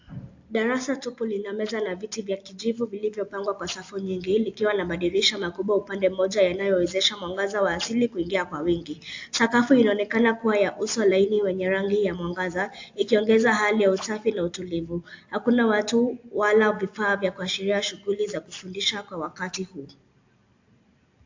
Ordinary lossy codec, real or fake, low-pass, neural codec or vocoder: AAC, 48 kbps; real; 7.2 kHz; none